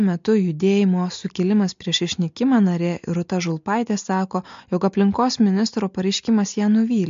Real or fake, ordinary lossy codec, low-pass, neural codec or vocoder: real; MP3, 48 kbps; 7.2 kHz; none